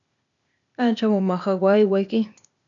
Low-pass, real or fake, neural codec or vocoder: 7.2 kHz; fake; codec, 16 kHz, 0.8 kbps, ZipCodec